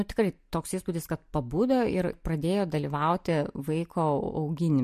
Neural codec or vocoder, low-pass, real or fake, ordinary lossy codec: none; 14.4 kHz; real; MP3, 64 kbps